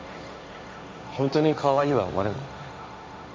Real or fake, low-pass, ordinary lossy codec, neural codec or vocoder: fake; none; none; codec, 16 kHz, 1.1 kbps, Voila-Tokenizer